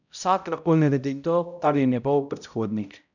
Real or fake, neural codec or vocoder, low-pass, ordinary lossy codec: fake; codec, 16 kHz, 0.5 kbps, X-Codec, HuBERT features, trained on balanced general audio; 7.2 kHz; none